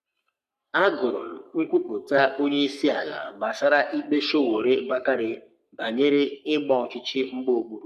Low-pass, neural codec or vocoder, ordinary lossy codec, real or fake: 14.4 kHz; codec, 44.1 kHz, 3.4 kbps, Pupu-Codec; none; fake